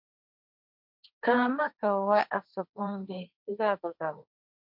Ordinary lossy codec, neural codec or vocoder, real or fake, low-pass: MP3, 48 kbps; codec, 16 kHz, 1.1 kbps, Voila-Tokenizer; fake; 5.4 kHz